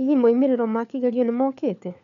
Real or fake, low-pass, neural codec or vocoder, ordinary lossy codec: fake; 7.2 kHz; codec, 16 kHz, 4 kbps, FunCodec, trained on LibriTTS, 50 frames a second; none